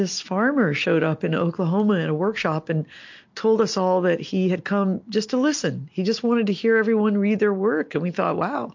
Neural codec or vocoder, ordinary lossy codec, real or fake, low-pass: none; MP3, 48 kbps; real; 7.2 kHz